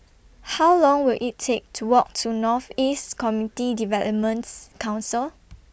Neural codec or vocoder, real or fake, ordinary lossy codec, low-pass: none; real; none; none